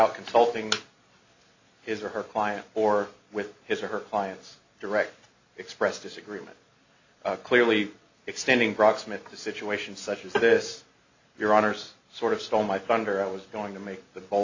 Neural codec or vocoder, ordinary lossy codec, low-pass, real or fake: none; AAC, 48 kbps; 7.2 kHz; real